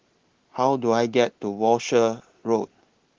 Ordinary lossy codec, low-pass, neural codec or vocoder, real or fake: Opus, 16 kbps; 7.2 kHz; none; real